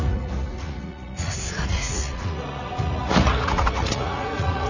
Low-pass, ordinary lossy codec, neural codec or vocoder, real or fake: 7.2 kHz; none; vocoder, 44.1 kHz, 80 mel bands, Vocos; fake